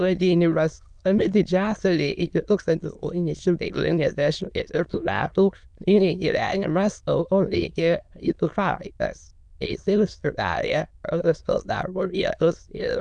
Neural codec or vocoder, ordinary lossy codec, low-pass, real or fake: autoencoder, 22.05 kHz, a latent of 192 numbers a frame, VITS, trained on many speakers; MP3, 96 kbps; 9.9 kHz; fake